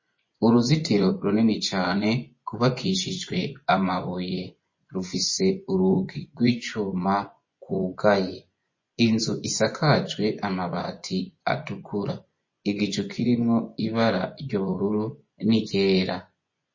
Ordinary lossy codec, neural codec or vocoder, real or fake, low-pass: MP3, 32 kbps; vocoder, 24 kHz, 100 mel bands, Vocos; fake; 7.2 kHz